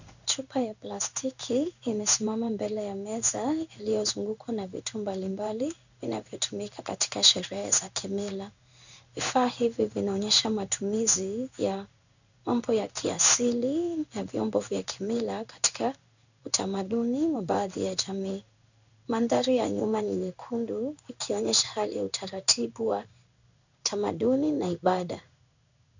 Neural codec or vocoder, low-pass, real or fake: codec, 16 kHz in and 24 kHz out, 1 kbps, XY-Tokenizer; 7.2 kHz; fake